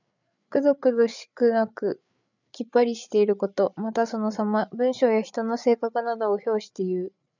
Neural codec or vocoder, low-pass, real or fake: codec, 16 kHz, 8 kbps, FreqCodec, larger model; 7.2 kHz; fake